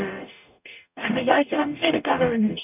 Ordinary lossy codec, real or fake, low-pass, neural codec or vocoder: none; fake; 3.6 kHz; codec, 44.1 kHz, 0.9 kbps, DAC